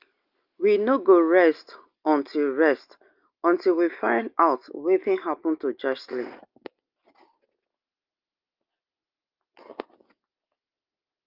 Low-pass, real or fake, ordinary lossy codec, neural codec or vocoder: 5.4 kHz; real; Opus, 32 kbps; none